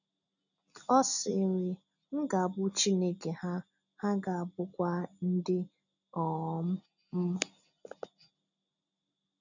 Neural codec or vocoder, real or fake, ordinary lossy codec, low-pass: none; real; none; 7.2 kHz